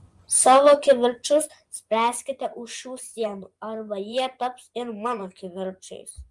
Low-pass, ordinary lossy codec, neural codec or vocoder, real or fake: 10.8 kHz; Opus, 32 kbps; vocoder, 24 kHz, 100 mel bands, Vocos; fake